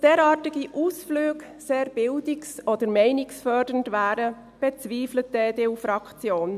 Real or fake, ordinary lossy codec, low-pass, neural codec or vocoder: real; none; 14.4 kHz; none